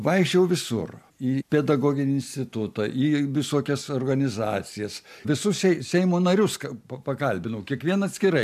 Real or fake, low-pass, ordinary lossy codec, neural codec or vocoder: real; 14.4 kHz; AAC, 96 kbps; none